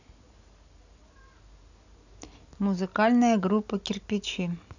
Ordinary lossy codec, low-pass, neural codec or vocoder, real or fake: none; 7.2 kHz; none; real